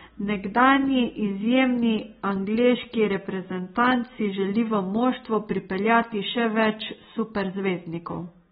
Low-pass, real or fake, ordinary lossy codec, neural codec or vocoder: 19.8 kHz; real; AAC, 16 kbps; none